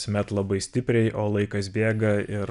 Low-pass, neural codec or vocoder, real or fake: 10.8 kHz; none; real